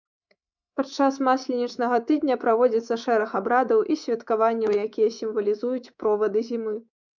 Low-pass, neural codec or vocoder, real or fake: 7.2 kHz; codec, 24 kHz, 3.1 kbps, DualCodec; fake